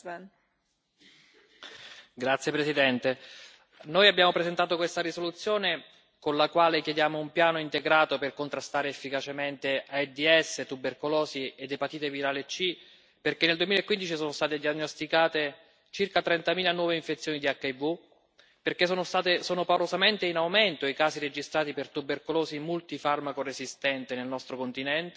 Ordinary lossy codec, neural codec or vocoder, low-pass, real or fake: none; none; none; real